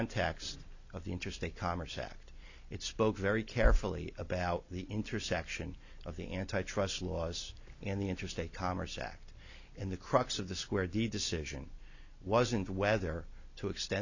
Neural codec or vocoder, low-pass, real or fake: none; 7.2 kHz; real